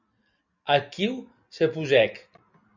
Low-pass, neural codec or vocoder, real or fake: 7.2 kHz; none; real